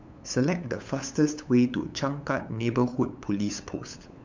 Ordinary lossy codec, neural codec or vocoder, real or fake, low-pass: MP3, 64 kbps; codec, 16 kHz, 8 kbps, FunCodec, trained on LibriTTS, 25 frames a second; fake; 7.2 kHz